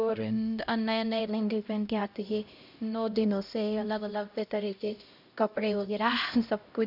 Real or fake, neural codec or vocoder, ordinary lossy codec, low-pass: fake; codec, 16 kHz, 0.5 kbps, X-Codec, HuBERT features, trained on LibriSpeech; none; 5.4 kHz